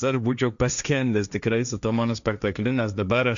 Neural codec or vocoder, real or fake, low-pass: codec, 16 kHz, 1.1 kbps, Voila-Tokenizer; fake; 7.2 kHz